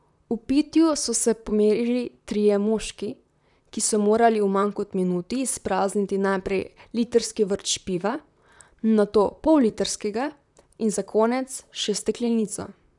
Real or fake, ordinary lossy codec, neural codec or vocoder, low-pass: fake; none; vocoder, 44.1 kHz, 128 mel bands, Pupu-Vocoder; 10.8 kHz